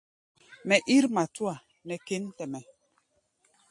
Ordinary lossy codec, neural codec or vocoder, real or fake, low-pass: MP3, 48 kbps; none; real; 10.8 kHz